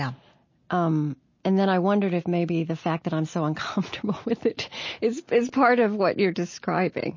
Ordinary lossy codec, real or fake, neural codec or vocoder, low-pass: MP3, 32 kbps; real; none; 7.2 kHz